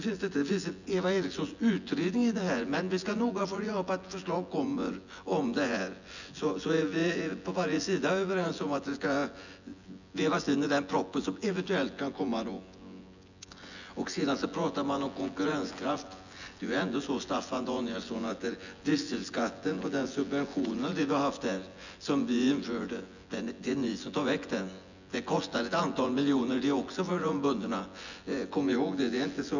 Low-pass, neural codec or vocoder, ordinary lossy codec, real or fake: 7.2 kHz; vocoder, 24 kHz, 100 mel bands, Vocos; none; fake